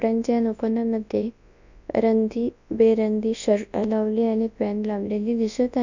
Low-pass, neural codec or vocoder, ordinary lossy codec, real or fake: 7.2 kHz; codec, 24 kHz, 0.9 kbps, WavTokenizer, large speech release; none; fake